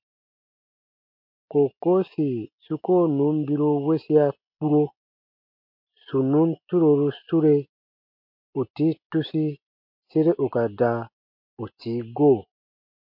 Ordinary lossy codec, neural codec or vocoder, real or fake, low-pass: MP3, 48 kbps; none; real; 5.4 kHz